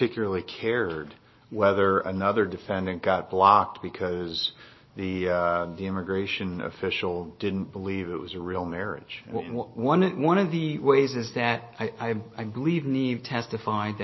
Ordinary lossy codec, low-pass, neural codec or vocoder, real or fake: MP3, 24 kbps; 7.2 kHz; none; real